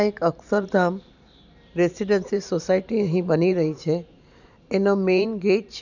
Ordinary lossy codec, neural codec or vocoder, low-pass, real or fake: none; vocoder, 44.1 kHz, 128 mel bands every 512 samples, BigVGAN v2; 7.2 kHz; fake